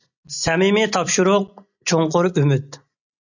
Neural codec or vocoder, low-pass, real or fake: none; 7.2 kHz; real